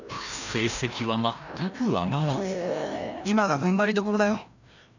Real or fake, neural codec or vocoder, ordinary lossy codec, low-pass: fake; codec, 16 kHz, 1 kbps, FreqCodec, larger model; none; 7.2 kHz